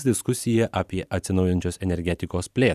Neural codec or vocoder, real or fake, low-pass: vocoder, 44.1 kHz, 128 mel bands every 512 samples, BigVGAN v2; fake; 14.4 kHz